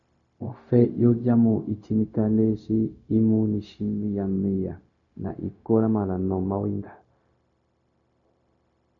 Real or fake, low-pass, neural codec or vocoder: fake; 7.2 kHz; codec, 16 kHz, 0.4 kbps, LongCat-Audio-Codec